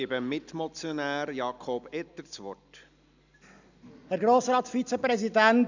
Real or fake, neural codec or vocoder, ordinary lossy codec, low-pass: real; none; none; 7.2 kHz